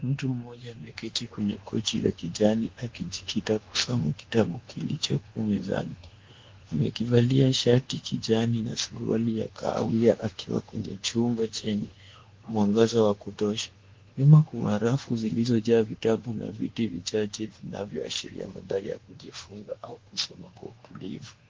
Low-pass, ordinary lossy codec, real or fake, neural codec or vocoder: 7.2 kHz; Opus, 16 kbps; fake; codec, 24 kHz, 1.2 kbps, DualCodec